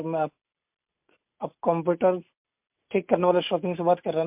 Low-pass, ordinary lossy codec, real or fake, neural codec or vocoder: 3.6 kHz; MP3, 32 kbps; real; none